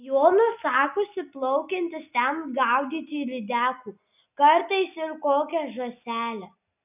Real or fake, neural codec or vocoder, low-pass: real; none; 3.6 kHz